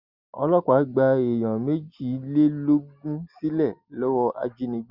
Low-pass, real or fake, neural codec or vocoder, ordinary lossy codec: 5.4 kHz; real; none; Opus, 64 kbps